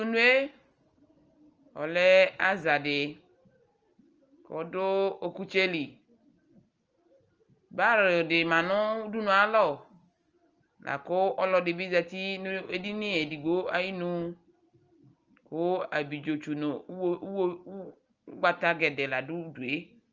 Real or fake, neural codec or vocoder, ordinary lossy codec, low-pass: real; none; Opus, 24 kbps; 7.2 kHz